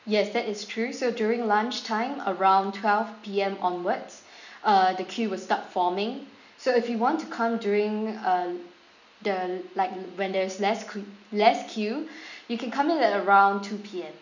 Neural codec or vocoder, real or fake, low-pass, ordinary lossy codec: none; real; 7.2 kHz; none